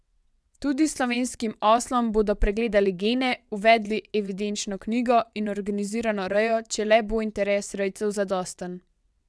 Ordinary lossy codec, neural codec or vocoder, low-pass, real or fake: none; vocoder, 22.05 kHz, 80 mel bands, WaveNeXt; none; fake